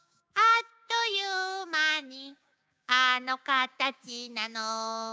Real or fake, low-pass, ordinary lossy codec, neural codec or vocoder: fake; none; none; codec, 16 kHz, 6 kbps, DAC